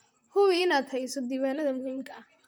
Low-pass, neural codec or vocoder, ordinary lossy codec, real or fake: none; vocoder, 44.1 kHz, 128 mel bands, Pupu-Vocoder; none; fake